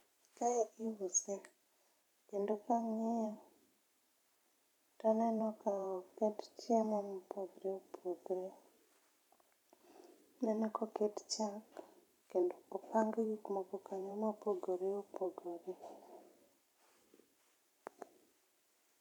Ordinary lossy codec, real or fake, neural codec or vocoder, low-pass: none; fake; vocoder, 44.1 kHz, 128 mel bands every 512 samples, BigVGAN v2; 19.8 kHz